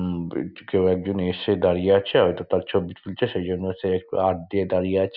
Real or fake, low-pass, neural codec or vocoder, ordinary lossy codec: real; 5.4 kHz; none; none